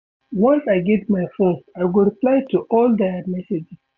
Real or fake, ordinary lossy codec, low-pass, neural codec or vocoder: real; none; 7.2 kHz; none